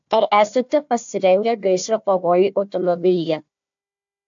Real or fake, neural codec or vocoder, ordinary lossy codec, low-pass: fake; codec, 16 kHz, 1 kbps, FunCodec, trained on Chinese and English, 50 frames a second; AAC, 64 kbps; 7.2 kHz